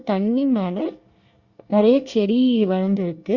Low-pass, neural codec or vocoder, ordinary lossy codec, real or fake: 7.2 kHz; codec, 24 kHz, 1 kbps, SNAC; Opus, 64 kbps; fake